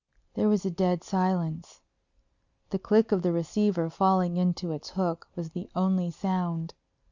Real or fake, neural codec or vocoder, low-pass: real; none; 7.2 kHz